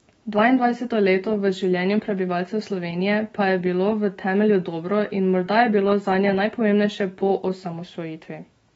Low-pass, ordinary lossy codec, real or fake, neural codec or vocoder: 19.8 kHz; AAC, 24 kbps; fake; autoencoder, 48 kHz, 128 numbers a frame, DAC-VAE, trained on Japanese speech